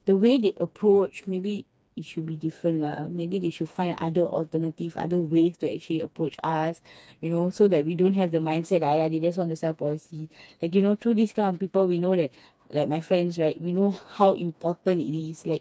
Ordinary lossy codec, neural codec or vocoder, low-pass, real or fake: none; codec, 16 kHz, 2 kbps, FreqCodec, smaller model; none; fake